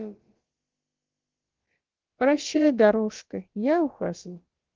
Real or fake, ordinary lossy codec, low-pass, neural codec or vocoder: fake; Opus, 16 kbps; 7.2 kHz; codec, 16 kHz, about 1 kbps, DyCAST, with the encoder's durations